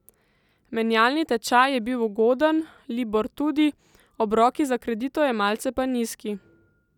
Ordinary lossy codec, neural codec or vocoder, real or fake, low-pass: none; none; real; 19.8 kHz